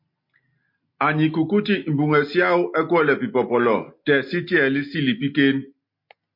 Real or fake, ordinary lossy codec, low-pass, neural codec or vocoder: real; MP3, 32 kbps; 5.4 kHz; none